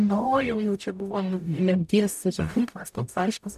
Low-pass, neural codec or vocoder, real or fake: 14.4 kHz; codec, 44.1 kHz, 0.9 kbps, DAC; fake